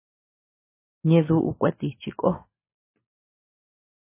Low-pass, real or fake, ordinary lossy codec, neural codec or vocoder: 3.6 kHz; real; MP3, 16 kbps; none